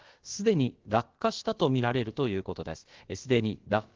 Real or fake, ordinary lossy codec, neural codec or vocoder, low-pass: fake; Opus, 16 kbps; codec, 16 kHz, about 1 kbps, DyCAST, with the encoder's durations; 7.2 kHz